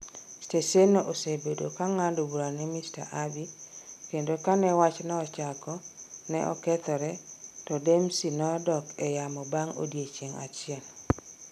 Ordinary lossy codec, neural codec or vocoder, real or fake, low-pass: none; none; real; 14.4 kHz